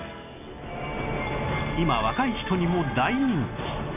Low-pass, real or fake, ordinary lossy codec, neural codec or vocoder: 3.6 kHz; real; none; none